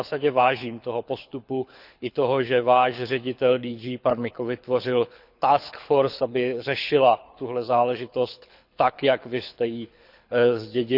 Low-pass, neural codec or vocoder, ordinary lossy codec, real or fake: 5.4 kHz; codec, 24 kHz, 6 kbps, HILCodec; none; fake